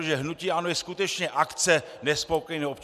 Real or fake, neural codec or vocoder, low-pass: real; none; 14.4 kHz